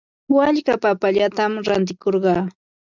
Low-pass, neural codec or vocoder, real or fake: 7.2 kHz; none; real